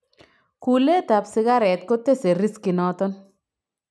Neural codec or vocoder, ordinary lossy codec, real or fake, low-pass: none; none; real; none